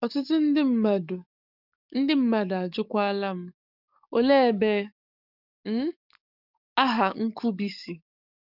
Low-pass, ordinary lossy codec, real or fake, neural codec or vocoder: 5.4 kHz; none; fake; codec, 44.1 kHz, 7.8 kbps, DAC